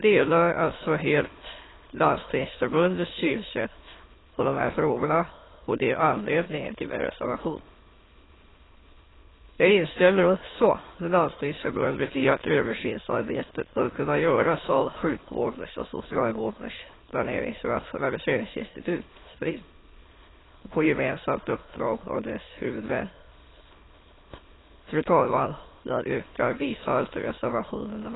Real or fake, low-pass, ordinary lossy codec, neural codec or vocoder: fake; 7.2 kHz; AAC, 16 kbps; autoencoder, 22.05 kHz, a latent of 192 numbers a frame, VITS, trained on many speakers